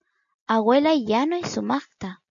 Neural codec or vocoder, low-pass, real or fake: none; 7.2 kHz; real